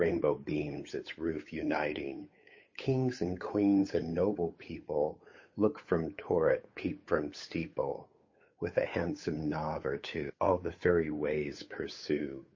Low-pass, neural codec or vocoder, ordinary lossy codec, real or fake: 7.2 kHz; codec, 16 kHz, 8 kbps, FunCodec, trained on Chinese and English, 25 frames a second; MP3, 32 kbps; fake